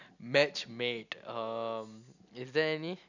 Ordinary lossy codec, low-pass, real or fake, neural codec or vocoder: none; 7.2 kHz; real; none